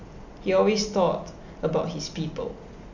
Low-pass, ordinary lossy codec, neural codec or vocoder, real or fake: 7.2 kHz; none; none; real